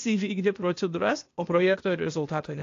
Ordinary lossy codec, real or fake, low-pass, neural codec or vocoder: AAC, 48 kbps; fake; 7.2 kHz; codec, 16 kHz, 0.8 kbps, ZipCodec